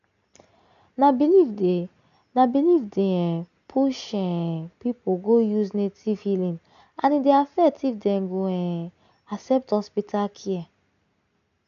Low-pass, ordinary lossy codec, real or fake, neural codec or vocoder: 7.2 kHz; none; real; none